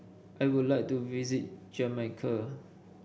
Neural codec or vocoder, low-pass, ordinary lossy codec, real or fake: none; none; none; real